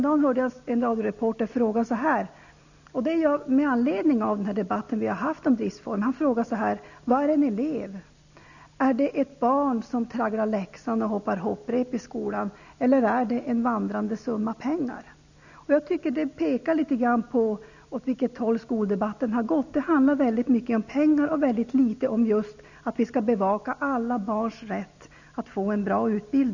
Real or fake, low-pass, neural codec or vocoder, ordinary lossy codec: real; 7.2 kHz; none; none